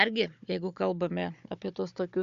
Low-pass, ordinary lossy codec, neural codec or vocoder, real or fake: 7.2 kHz; AAC, 64 kbps; codec, 16 kHz, 16 kbps, FunCodec, trained on Chinese and English, 50 frames a second; fake